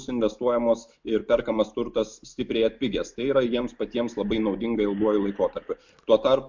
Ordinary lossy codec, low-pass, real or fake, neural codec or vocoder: MP3, 64 kbps; 7.2 kHz; real; none